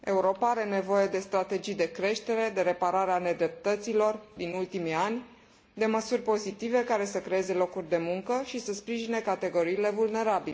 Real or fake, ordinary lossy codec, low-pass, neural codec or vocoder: real; none; none; none